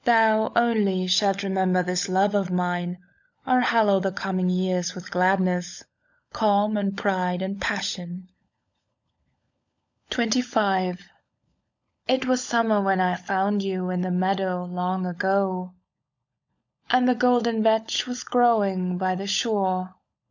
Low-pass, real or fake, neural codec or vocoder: 7.2 kHz; fake; codec, 16 kHz, 16 kbps, FunCodec, trained on LibriTTS, 50 frames a second